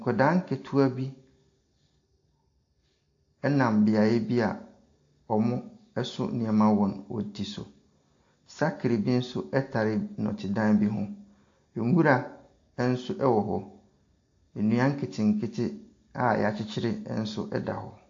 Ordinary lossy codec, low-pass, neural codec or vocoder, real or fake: AAC, 48 kbps; 7.2 kHz; none; real